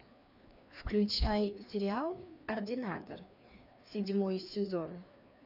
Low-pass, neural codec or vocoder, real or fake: 5.4 kHz; codec, 16 kHz, 2 kbps, FreqCodec, larger model; fake